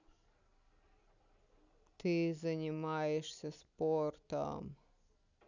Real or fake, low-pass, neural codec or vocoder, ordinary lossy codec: real; 7.2 kHz; none; none